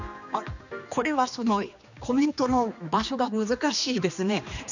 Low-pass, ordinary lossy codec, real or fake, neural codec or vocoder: 7.2 kHz; none; fake; codec, 16 kHz, 2 kbps, X-Codec, HuBERT features, trained on general audio